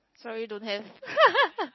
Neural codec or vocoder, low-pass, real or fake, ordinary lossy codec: none; 7.2 kHz; real; MP3, 24 kbps